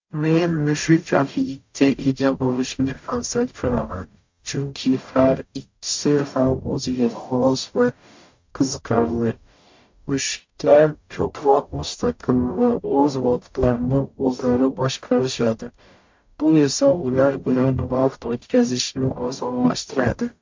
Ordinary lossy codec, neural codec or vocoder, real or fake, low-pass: MP3, 48 kbps; codec, 44.1 kHz, 0.9 kbps, DAC; fake; 7.2 kHz